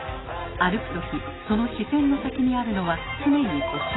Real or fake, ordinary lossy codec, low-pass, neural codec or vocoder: real; AAC, 16 kbps; 7.2 kHz; none